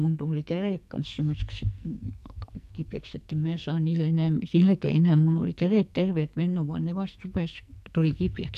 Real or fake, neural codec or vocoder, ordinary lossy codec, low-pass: fake; codec, 44.1 kHz, 2.6 kbps, SNAC; none; 14.4 kHz